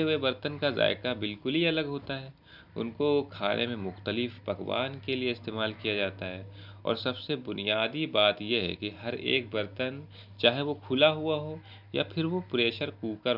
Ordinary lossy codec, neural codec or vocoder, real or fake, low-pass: none; none; real; 5.4 kHz